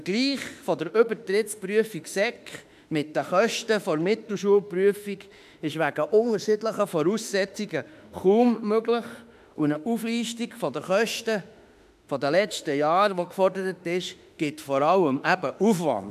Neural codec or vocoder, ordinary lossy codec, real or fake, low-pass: autoencoder, 48 kHz, 32 numbers a frame, DAC-VAE, trained on Japanese speech; none; fake; 14.4 kHz